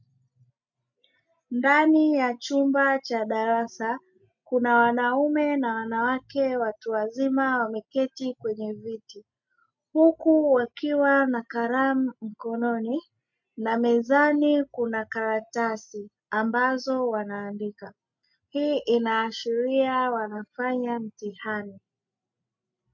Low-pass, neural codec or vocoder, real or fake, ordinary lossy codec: 7.2 kHz; none; real; MP3, 48 kbps